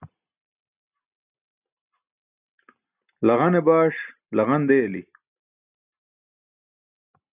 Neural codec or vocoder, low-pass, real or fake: none; 3.6 kHz; real